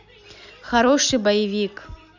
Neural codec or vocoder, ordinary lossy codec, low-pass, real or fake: none; none; 7.2 kHz; real